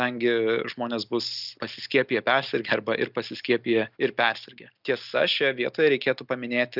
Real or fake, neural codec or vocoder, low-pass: real; none; 5.4 kHz